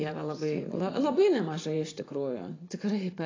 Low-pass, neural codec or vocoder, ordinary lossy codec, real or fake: 7.2 kHz; vocoder, 24 kHz, 100 mel bands, Vocos; AAC, 32 kbps; fake